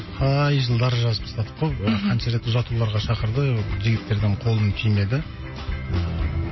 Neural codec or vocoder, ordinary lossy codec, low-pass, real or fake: none; MP3, 24 kbps; 7.2 kHz; real